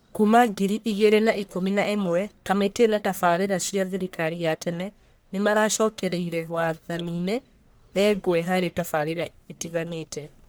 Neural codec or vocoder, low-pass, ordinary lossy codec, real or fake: codec, 44.1 kHz, 1.7 kbps, Pupu-Codec; none; none; fake